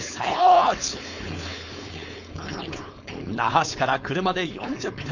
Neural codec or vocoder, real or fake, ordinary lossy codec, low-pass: codec, 16 kHz, 4.8 kbps, FACodec; fake; none; 7.2 kHz